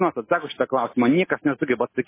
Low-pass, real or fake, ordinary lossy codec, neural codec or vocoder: 3.6 kHz; real; MP3, 16 kbps; none